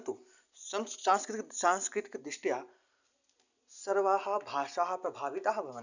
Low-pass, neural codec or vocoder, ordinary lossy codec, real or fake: 7.2 kHz; none; none; real